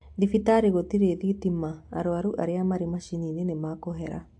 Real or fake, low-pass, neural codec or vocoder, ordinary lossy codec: real; 10.8 kHz; none; AAC, 64 kbps